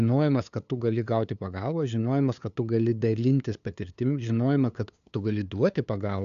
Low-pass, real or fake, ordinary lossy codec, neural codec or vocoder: 7.2 kHz; fake; AAC, 96 kbps; codec, 16 kHz, 2 kbps, FunCodec, trained on LibriTTS, 25 frames a second